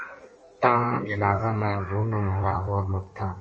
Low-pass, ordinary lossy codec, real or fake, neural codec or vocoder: 9.9 kHz; MP3, 32 kbps; fake; codec, 16 kHz in and 24 kHz out, 1.1 kbps, FireRedTTS-2 codec